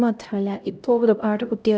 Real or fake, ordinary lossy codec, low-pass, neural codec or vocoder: fake; none; none; codec, 16 kHz, 0.5 kbps, X-Codec, HuBERT features, trained on LibriSpeech